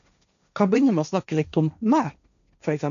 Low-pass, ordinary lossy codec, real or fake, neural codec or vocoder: 7.2 kHz; none; fake; codec, 16 kHz, 1.1 kbps, Voila-Tokenizer